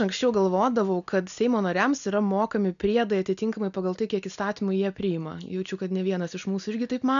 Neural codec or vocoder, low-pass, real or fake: none; 7.2 kHz; real